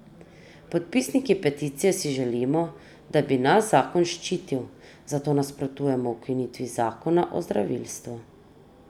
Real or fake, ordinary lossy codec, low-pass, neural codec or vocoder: real; none; 19.8 kHz; none